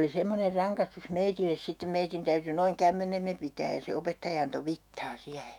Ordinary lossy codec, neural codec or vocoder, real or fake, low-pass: none; autoencoder, 48 kHz, 128 numbers a frame, DAC-VAE, trained on Japanese speech; fake; 19.8 kHz